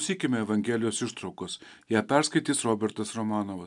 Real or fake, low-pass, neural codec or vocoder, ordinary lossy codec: real; 10.8 kHz; none; MP3, 96 kbps